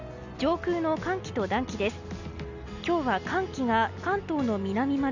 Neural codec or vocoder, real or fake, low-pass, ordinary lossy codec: none; real; 7.2 kHz; none